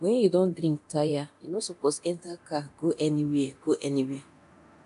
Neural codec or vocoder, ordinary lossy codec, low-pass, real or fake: codec, 24 kHz, 0.9 kbps, DualCodec; none; 10.8 kHz; fake